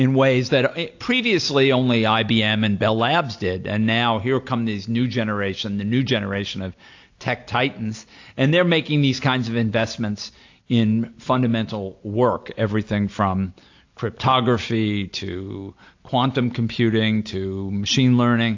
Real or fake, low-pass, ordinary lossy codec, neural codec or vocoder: real; 7.2 kHz; AAC, 48 kbps; none